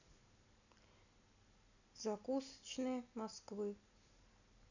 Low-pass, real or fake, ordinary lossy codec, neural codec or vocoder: 7.2 kHz; real; none; none